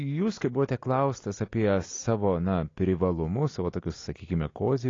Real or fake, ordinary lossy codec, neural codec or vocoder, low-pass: real; AAC, 32 kbps; none; 7.2 kHz